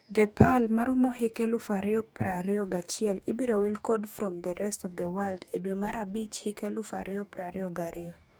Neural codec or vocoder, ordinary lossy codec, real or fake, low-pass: codec, 44.1 kHz, 2.6 kbps, DAC; none; fake; none